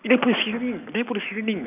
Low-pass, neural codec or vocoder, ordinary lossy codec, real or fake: 3.6 kHz; none; none; real